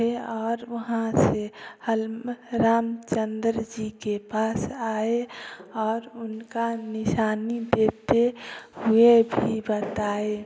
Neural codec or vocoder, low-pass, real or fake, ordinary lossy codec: none; none; real; none